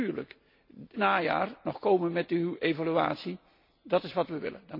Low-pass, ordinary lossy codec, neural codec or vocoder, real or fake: 5.4 kHz; MP3, 32 kbps; none; real